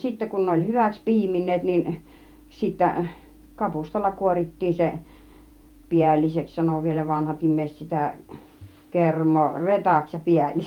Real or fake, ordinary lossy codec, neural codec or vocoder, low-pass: fake; Opus, 32 kbps; vocoder, 48 kHz, 128 mel bands, Vocos; 19.8 kHz